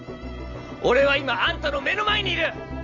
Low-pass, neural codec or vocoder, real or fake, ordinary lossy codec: 7.2 kHz; none; real; none